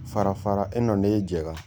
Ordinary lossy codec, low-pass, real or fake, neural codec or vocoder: none; none; real; none